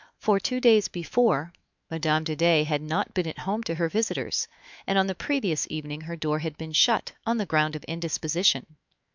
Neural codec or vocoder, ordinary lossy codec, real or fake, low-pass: autoencoder, 48 kHz, 128 numbers a frame, DAC-VAE, trained on Japanese speech; MP3, 64 kbps; fake; 7.2 kHz